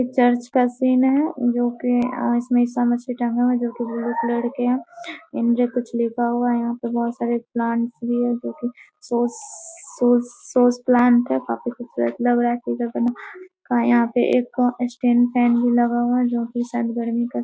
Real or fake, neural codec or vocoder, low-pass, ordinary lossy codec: real; none; none; none